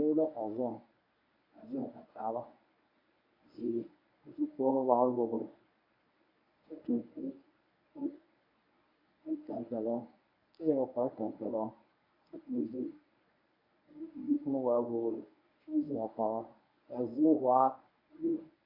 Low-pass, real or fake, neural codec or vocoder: 5.4 kHz; fake; codec, 24 kHz, 0.9 kbps, WavTokenizer, medium speech release version 1